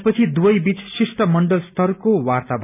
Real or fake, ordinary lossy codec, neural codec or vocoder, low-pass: real; none; none; 3.6 kHz